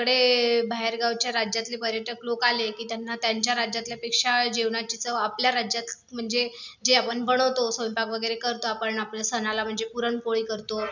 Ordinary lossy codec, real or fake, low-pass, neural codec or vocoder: none; real; 7.2 kHz; none